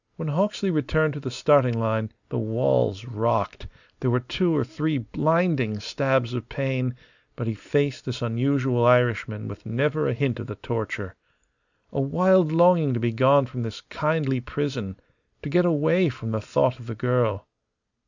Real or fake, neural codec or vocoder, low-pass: real; none; 7.2 kHz